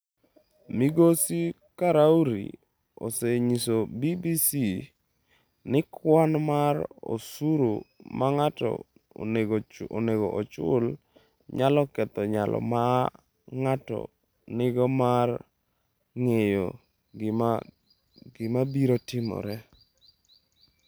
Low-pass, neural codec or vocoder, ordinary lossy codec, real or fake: none; none; none; real